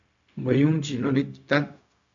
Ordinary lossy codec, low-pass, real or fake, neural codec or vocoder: MP3, 64 kbps; 7.2 kHz; fake; codec, 16 kHz, 0.4 kbps, LongCat-Audio-Codec